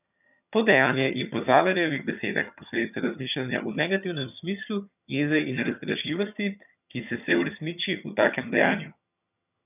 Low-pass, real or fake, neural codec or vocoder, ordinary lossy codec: 3.6 kHz; fake; vocoder, 22.05 kHz, 80 mel bands, HiFi-GAN; none